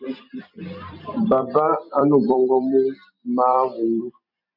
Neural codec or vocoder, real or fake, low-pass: vocoder, 44.1 kHz, 128 mel bands every 256 samples, BigVGAN v2; fake; 5.4 kHz